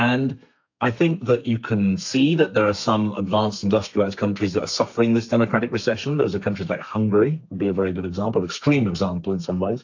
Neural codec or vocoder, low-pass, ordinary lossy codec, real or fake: codec, 32 kHz, 1.9 kbps, SNAC; 7.2 kHz; AAC, 48 kbps; fake